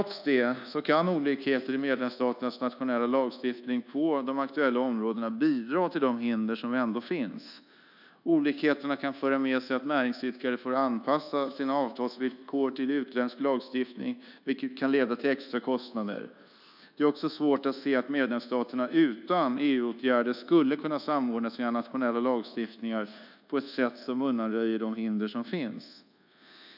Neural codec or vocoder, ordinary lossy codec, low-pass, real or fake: codec, 24 kHz, 1.2 kbps, DualCodec; none; 5.4 kHz; fake